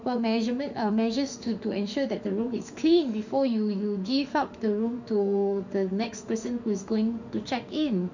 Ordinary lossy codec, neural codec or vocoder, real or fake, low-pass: none; autoencoder, 48 kHz, 32 numbers a frame, DAC-VAE, trained on Japanese speech; fake; 7.2 kHz